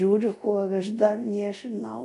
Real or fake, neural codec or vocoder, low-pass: fake; codec, 24 kHz, 0.5 kbps, DualCodec; 10.8 kHz